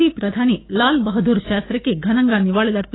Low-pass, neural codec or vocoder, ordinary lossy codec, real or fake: 7.2 kHz; codec, 16 kHz, 6 kbps, DAC; AAC, 16 kbps; fake